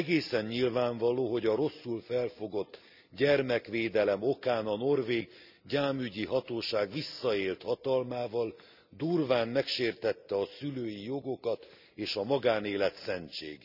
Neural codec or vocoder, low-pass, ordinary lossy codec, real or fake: none; 5.4 kHz; none; real